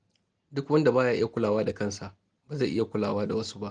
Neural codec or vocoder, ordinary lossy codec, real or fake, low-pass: none; Opus, 24 kbps; real; 9.9 kHz